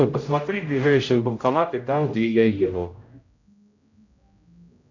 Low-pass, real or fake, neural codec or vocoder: 7.2 kHz; fake; codec, 16 kHz, 0.5 kbps, X-Codec, HuBERT features, trained on general audio